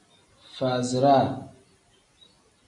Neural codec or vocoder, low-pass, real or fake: none; 10.8 kHz; real